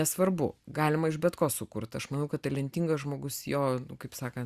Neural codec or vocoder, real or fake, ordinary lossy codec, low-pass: none; real; Opus, 64 kbps; 14.4 kHz